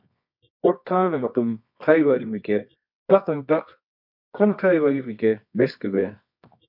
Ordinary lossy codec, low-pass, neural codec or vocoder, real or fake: MP3, 48 kbps; 5.4 kHz; codec, 24 kHz, 0.9 kbps, WavTokenizer, medium music audio release; fake